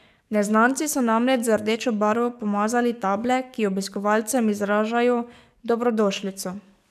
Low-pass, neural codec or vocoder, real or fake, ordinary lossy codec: 14.4 kHz; codec, 44.1 kHz, 7.8 kbps, DAC; fake; none